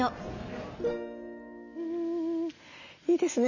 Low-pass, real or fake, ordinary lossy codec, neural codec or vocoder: 7.2 kHz; real; none; none